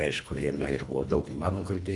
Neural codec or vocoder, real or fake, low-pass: codec, 24 kHz, 1.5 kbps, HILCodec; fake; 10.8 kHz